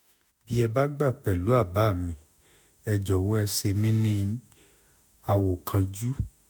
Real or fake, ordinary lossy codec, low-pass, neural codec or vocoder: fake; none; none; autoencoder, 48 kHz, 32 numbers a frame, DAC-VAE, trained on Japanese speech